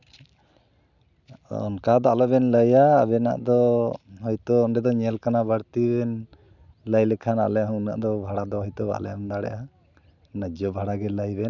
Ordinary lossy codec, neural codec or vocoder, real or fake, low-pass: none; none; real; 7.2 kHz